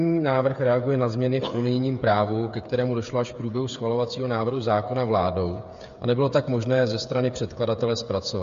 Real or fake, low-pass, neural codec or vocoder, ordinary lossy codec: fake; 7.2 kHz; codec, 16 kHz, 8 kbps, FreqCodec, smaller model; MP3, 48 kbps